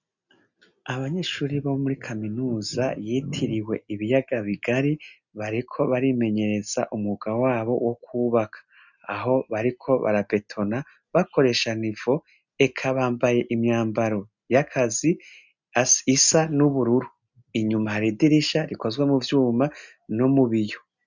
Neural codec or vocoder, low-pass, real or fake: none; 7.2 kHz; real